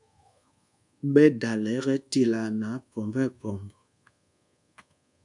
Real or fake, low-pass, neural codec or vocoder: fake; 10.8 kHz; codec, 24 kHz, 1.2 kbps, DualCodec